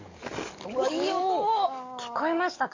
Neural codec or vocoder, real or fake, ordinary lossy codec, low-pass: codec, 44.1 kHz, 7.8 kbps, DAC; fake; MP3, 64 kbps; 7.2 kHz